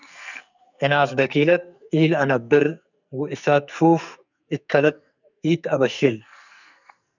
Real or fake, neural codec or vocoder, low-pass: fake; codec, 44.1 kHz, 2.6 kbps, SNAC; 7.2 kHz